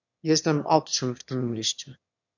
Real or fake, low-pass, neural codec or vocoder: fake; 7.2 kHz; autoencoder, 22.05 kHz, a latent of 192 numbers a frame, VITS, trained on one speaker